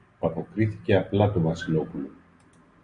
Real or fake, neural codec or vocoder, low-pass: real; none; 9.9 kHz